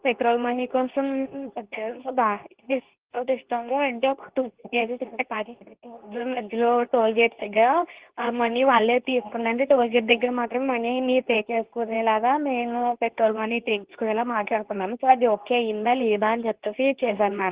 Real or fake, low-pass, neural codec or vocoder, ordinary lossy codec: fake; 3.6 kHz; codec, 24 kHz, 0.9 kbps, WavTokenizer, medium speech release version 2; Opus, 16 kbps